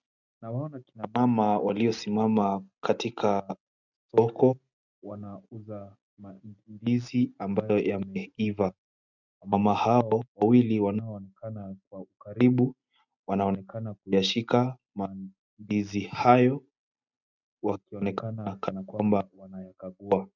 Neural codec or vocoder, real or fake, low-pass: none; real; 7.2 kHz